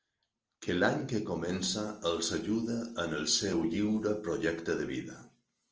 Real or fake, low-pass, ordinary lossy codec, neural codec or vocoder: real; 7.2 kHz; Opus, 24 kbps; none